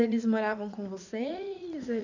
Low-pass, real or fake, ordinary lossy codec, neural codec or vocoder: 7.2 kHz; fake; none; vocoder, 44.1 kHz, 80 mel bands, Vocos